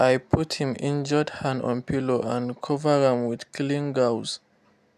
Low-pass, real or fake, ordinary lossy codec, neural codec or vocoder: 14.4 kHz; real; none; none